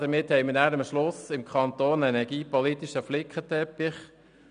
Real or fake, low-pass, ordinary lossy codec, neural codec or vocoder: real; 9.9 kHz; none; none